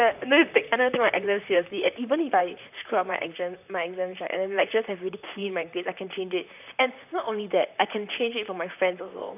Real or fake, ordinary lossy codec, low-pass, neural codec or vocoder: fake; none; 3.6 kHz; vocoder, 44.1 kHz, 128 mel bands, Pupu-Vocoder